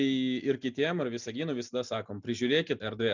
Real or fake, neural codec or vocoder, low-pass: real; none; 7.2 kHz